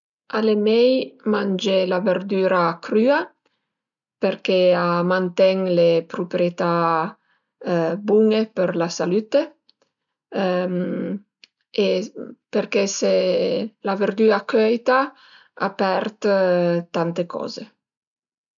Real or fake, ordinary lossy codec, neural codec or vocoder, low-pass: real; none; none; 7.2 kHz